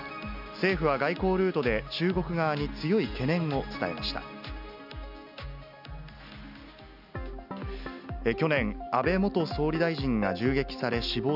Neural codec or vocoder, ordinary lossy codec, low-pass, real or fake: none; none; 5.4 kHz; real